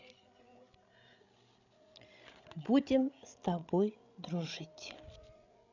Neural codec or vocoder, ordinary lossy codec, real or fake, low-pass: codec, 16 kHz, 16 kbps, FreqCodec, larger model; none; fake; 7.2 kHz